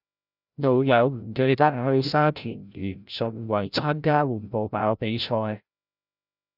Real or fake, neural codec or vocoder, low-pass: fake; codec, 16 kHz, 0.5 kbps, FreqCodec, larger model; 5.4 kHz